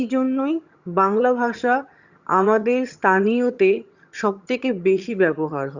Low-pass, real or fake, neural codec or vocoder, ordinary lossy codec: 7.2 kHz; fake; vocoder, 22.05 kHz, 80 mel bands, HiFi-GAN; Opus, 64 kbps